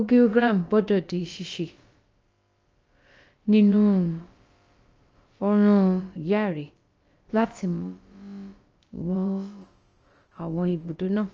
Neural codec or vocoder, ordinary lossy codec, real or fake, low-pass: codec, 16 kHz, about 1 kbps, DyCAST, with the encoder's durations; Opus, 24 kbps; fake; 7.2 kHz